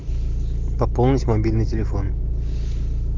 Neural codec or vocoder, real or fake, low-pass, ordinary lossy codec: none; real; 7.2 kHz; Opus, 32 kbps